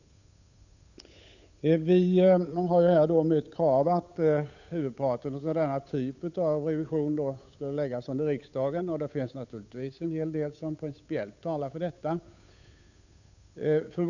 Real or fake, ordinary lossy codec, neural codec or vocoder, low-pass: fake; none; codec, 16 kHz, 8 kbps, FunCodec, trained on Chinese and English, 25 frames a second; 7.2 kHz